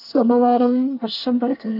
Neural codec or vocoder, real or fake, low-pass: codec, 24 kHz, 1 kbps, SNAC; fake; 5.4 kHz